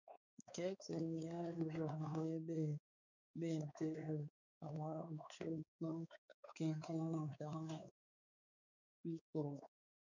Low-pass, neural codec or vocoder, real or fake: 7.2 kHz; codec, 16 kHz, 4 kbps, X-Codec, WavLM features, trained on Multilingual LibriSpeech; fake